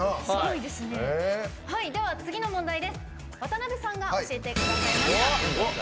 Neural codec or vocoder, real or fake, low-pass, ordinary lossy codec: none; real; none; none